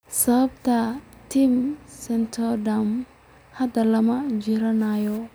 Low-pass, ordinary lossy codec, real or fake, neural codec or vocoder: none; none; real; none